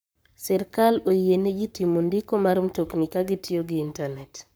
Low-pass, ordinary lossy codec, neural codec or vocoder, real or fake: none; none; codec, 44.1 kHz, 7.8 kbps, Pupu-Codec; fake